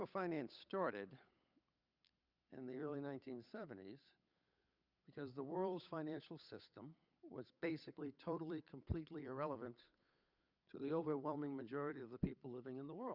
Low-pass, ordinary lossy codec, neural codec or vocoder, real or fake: 5.4 kHz; Opus, 64 kbps; codec, 16 kHz, 8 kbps, FunCodec, trained on Chinese and English, 25 frames a second; fake